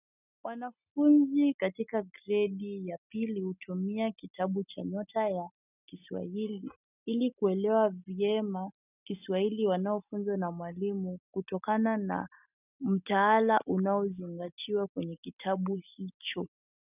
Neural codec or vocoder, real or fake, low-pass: none; real; 3.6 kHz